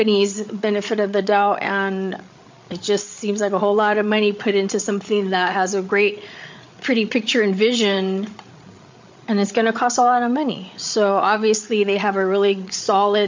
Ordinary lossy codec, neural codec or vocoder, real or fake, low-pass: MP3, 48 kbps; codec, 16 kHz, 8 kbps, FreqCodec, larger model; fake; 7.2 kHz